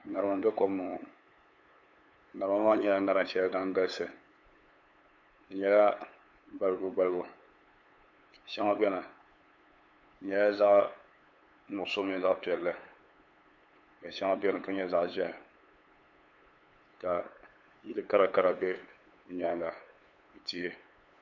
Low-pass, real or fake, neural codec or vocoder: 7.2 kHz; fake; codec, 16 kHz, 8 kbps, FunCodec, trained on LibriTTS, 25 frames a second